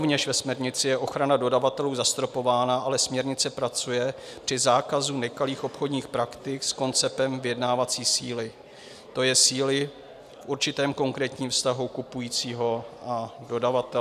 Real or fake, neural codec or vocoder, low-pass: real; none; 14.4 kHz